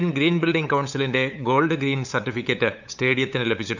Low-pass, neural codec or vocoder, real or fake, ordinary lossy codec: 7.2 kHz; codec, 16 kHz, 8 kbps, FunCodec, trained on LibriTTS, 25 frames a second; fake; none